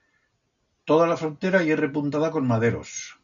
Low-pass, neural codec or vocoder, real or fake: 7.2 kHz; none; real